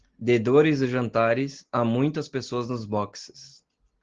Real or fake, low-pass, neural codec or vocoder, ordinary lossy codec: real; 7.2 kHz; none; Opus, 16 kbps